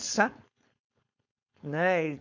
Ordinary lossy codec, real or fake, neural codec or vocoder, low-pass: MP3, 48 kbps; fake; codec, 16 kHz, 4.8 kbps, FACodec; 7.2 kHz